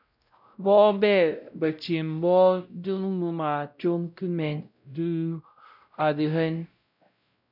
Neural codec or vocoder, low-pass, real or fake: codec, 16 kHz, 0.5 kbps, X-Codec, WavLM features, trained on Multilingual LibriSpeech; 5.4 kHz; fake